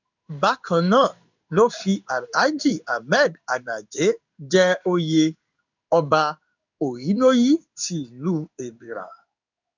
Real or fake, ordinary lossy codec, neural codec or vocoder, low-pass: fake; none; codec, 16 kHz in and 24 kHz out, 1 kbps, XY-Tokenizer; 7.2 kHz